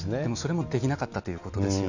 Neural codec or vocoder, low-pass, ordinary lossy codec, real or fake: none; 7.2 kHz; none; real